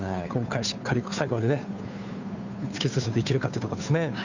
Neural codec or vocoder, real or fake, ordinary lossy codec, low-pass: codec, 16 kHz, 2 kbps, FunCodec, trained on Chinese and English, 25 frames a second; fake; none; 7.2 kHz